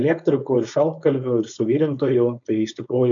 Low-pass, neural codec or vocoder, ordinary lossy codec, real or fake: 7.2 kHz; codec, 16 kHz, 4.8 kbps, FACodec; MP3, 64 kbps; fake